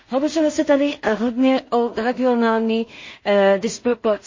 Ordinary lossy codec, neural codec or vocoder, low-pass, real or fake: MP3, 32 kbps; codec, 16 kHz in and 24 kHz out, 0.4 kbps, LongCat-Audio-Codec, two codebook decoder; 7.2 kHz; fake